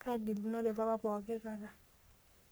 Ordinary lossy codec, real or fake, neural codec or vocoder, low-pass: none; fake; codec, 44.1 kHz, 3.4 kbps, Pupu-Codec; none